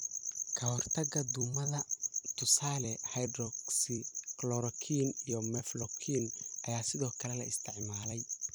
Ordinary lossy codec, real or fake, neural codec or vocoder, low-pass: none; fake; vocoder, 44.1 kHz, 128 mel bands every 256 samples, BigVGAN v2; none